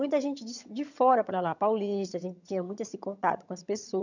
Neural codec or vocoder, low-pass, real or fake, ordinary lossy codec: vocoder, 22.05 kHz, 80 mel bands, HiFi-GAN; 7.2 kHz; fake; none